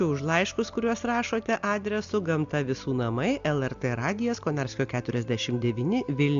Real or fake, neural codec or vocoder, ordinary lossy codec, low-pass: real; none; MP3, 64 kbps; 7.2 kHz